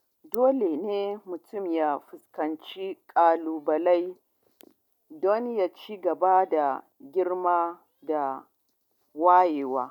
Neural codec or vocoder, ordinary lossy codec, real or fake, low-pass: none; none; real; 19.8 kHz